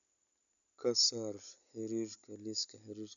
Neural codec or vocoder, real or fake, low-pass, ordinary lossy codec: none; real; 7.2 kHz; none